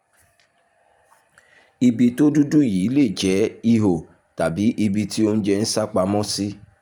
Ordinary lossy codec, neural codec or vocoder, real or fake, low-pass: none; vocoder, 44.1 kHz, 128 mel bands every 512 samples, BigVGAN v2; fake; 19.8 kHz